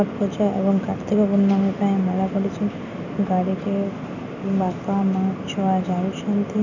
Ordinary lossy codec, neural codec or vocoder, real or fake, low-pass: none; none; real; 7.2 kHz